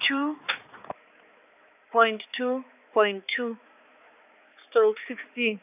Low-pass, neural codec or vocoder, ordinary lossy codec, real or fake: 3.6 kHz; codec, 16 kHz, 4 kbps, X-Codec, HuBERT features, trained on balanced general audio; none; fake